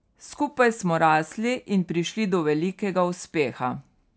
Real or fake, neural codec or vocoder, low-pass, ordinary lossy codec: real; none; none; none